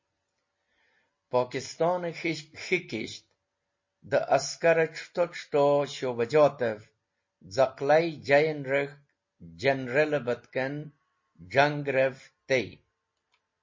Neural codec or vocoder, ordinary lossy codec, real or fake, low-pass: none; MP3, 32 kbps; real; 7.2 kHz